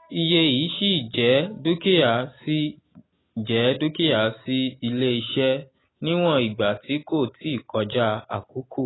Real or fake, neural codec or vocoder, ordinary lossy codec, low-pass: real; none; AAC, 16 kbps; 7.2 kHz